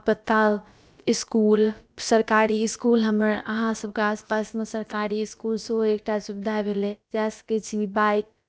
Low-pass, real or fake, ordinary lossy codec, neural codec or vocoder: none; fake; none; codec, 16 kHz, about 1 kbps, DyCAST, with the encoder's durations